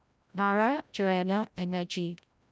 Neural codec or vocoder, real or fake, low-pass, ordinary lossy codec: codec, 16 kHz, 0.5 kbps, FreqCodec, larger model; fake; none; none